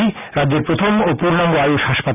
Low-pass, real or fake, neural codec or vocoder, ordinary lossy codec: 3.6 kHz; real; none; none